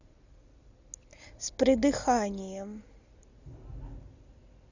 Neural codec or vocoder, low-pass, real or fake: none; 7.2 kHz; real